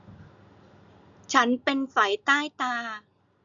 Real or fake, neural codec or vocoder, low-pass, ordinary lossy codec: real; none; 7.2 kHz; none